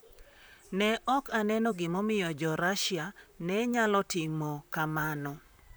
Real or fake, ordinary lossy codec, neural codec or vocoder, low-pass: fake; none; vocoder, 44.1 kHz, 128 mel bands, Pupu-Vocoder; none